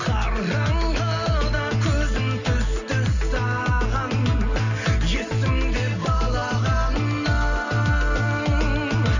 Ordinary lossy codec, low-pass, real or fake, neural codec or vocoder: none; 7.2 kHz; real; none